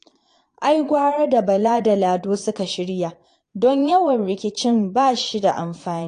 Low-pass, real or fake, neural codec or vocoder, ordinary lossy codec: 9.9 kHz; fake; vocoder, 22.05 kHz, 80 mel bands, Vocos; AAC, 48 kbps